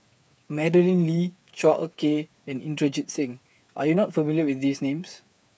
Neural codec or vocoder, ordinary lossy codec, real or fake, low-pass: codec, 16 kHz, 8 kbps, FreqCodec, smaller model; none; fake; none